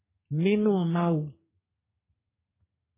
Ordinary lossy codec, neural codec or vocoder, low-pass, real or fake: MP3, 16 kbps; codec, 32 kHz, 1.9 kbps, SNAC; 3.6 kHz; fake